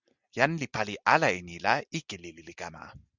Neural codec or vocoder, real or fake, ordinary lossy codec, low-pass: none; real; Opus, 64 kbps; 7.2 kHz